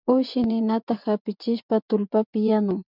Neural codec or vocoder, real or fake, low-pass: vocoder, 24 kHz, 100 mel bands, Vocos; fake; 5.4 kHz